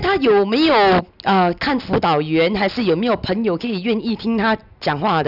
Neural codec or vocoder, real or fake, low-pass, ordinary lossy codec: none; real; 5.4 kHz; none